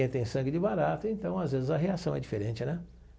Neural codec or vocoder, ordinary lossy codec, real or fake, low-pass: none; none; real; none